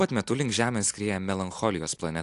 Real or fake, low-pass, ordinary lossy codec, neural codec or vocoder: real; 10.8 kHz; AAC, 64 kbps; none